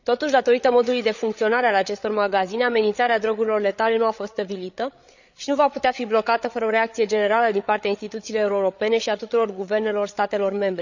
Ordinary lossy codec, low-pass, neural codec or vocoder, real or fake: none; 7.2 kHz; codec, 16 kHz, 8 kbps, FreqCodec, larger model; fake